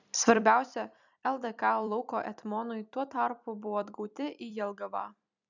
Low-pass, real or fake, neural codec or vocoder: 7.2 kHz; fake; vocoder, 44.1 kHz, 128 mel bands every 256 samples, BigVGAN v2